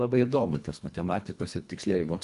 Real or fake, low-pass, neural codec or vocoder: fake; 10.8 kHz; codec, 24 kHz, 1.5 kbps, HILCodec